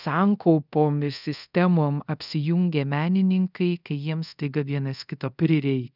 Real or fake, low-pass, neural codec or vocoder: fake; 5.4 kHz; codec, 24 kHz, 0.5 kbps, DualCodec